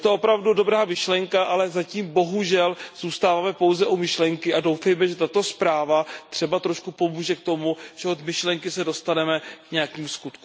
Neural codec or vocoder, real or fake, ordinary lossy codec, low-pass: none; real; none; none